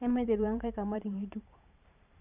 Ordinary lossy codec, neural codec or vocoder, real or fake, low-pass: none; vocoder, 44.1 kHz, 128 mel bands every 512 samples, BigVGAN v2; fake; 3.6 kHz